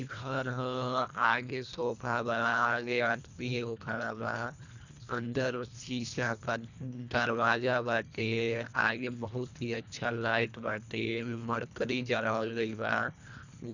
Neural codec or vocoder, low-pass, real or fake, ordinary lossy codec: codec, 24 kHz, 1.5 kbps, HILCodec; 7.2 kHz; fake; none